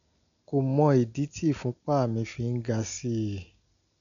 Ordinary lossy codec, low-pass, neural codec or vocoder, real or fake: none; 7.2 kHz; none; real